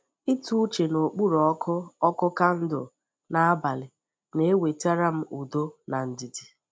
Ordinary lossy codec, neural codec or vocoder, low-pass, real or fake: none; none; none; real